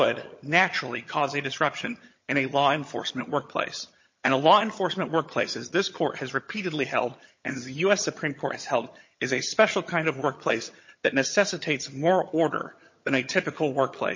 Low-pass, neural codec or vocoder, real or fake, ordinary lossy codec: 7.2 kHz; vocoder, 22.05 kHz, 80 mel bands, HiFi-GAN; fake; MP3, 32 kbps